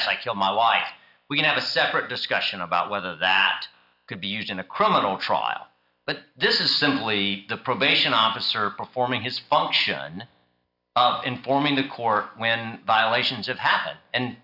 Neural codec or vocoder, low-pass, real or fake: none; 5.4 kHz; real